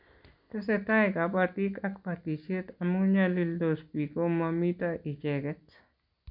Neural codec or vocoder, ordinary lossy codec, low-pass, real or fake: none; none; 5.4 kHz; real